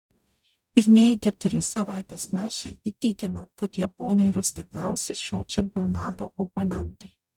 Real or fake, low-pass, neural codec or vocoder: fake; 19.8 kHz; codec, 44.1 kHz, 0.9 kbps, DAC